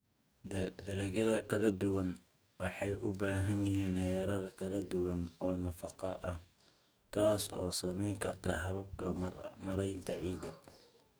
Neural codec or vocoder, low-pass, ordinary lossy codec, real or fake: codec, 44.1 kHz, 2.6 kbps, DAC; none; none; fake